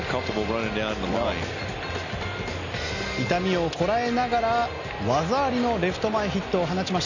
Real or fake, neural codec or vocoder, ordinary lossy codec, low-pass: real; none; none; 7.2 kHz